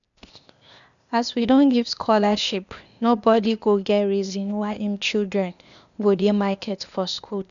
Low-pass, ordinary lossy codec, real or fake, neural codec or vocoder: 7.2 kHz; none; fake; codec, 16 kHz, 0.8 kbps, ZipCodec